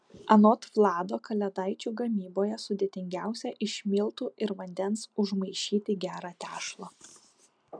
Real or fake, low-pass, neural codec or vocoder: real; 9.9 kHz; none